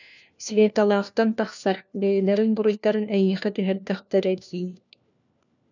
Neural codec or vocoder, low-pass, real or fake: codec, 16 kHz, 1 kbps, FunCodec, trained on LibriTTS, 50 frames a second; 7.2 kHz; fake